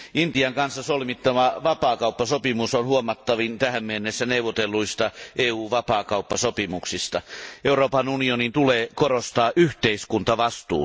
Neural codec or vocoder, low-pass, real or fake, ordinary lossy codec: none; none; real; none